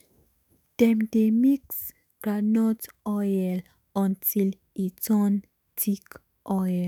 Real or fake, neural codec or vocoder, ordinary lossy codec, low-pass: fake; autoencoder, 48 kHz, 128 numbers a frame, DAC-VAE, trained on Japanese speech; none; none